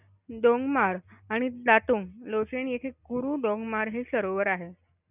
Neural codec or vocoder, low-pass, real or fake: none; 3.6 kHz; real